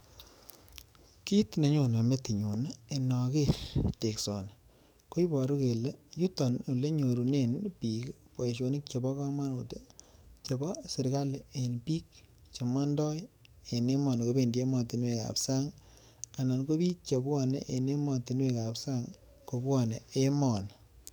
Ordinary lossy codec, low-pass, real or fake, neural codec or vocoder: none; none; fake; codec, 44.1 kHz, 7.8 kbps, DAC